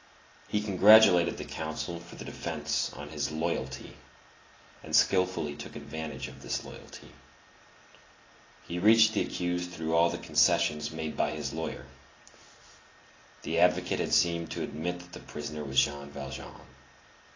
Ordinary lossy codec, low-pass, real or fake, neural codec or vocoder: AAC, 32 kbps; 7.2 kHz; real; none